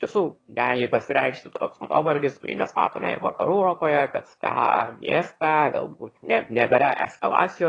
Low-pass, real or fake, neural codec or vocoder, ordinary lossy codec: 9.9 kHz; fake; autoencoder, 22.05 kHz, a latent of 192 numbers a frame, VITS, trained on one speaker; AAC, 32 kbps